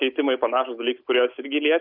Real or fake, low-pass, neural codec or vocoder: real; 5.4 kHz; none